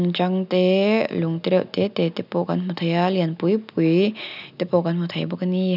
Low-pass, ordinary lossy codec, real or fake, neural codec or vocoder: 5.4 kHz; none; real; none